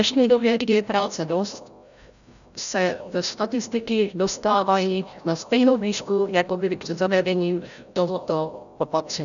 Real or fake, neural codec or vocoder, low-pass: fake; codec, 16 kHz, 0.5 kbps, FreqCodec, larger model; 7.2 kHz